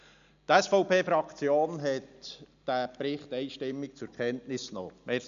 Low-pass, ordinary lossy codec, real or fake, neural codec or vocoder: 7.2 kHz; none; real; none